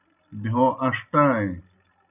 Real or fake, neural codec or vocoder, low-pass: real; none; 3.6 kHz